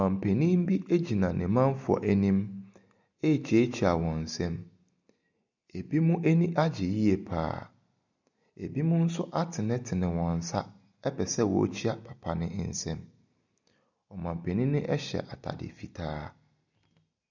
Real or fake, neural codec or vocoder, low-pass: real; none; 7.2 kHz